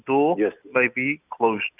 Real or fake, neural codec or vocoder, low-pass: real; none; 3.6 kHz